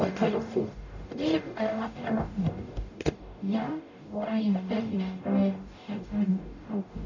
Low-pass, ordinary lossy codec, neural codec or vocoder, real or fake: 7.2 kHz; none; codec, 44.1 kHz, 0.9 kbps, DAC; fake